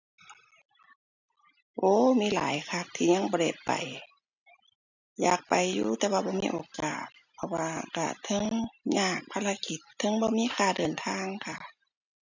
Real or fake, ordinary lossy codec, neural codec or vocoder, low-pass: real; none; none; 7.2 kHz